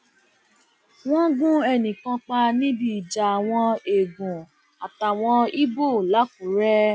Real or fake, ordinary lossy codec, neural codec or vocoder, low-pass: real; none; none; none